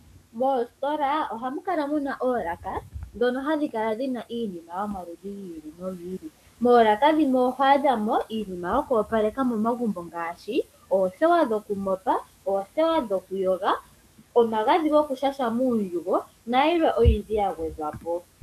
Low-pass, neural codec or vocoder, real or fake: 14.4 kHz; codec, 44.1 kHz, 7.8 kbps, DAC; fake